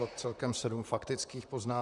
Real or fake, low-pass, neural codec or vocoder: fake; 10.8 kHz; vocoder, 44.1 kHz, 128 mel bands, Pupu-Vocoder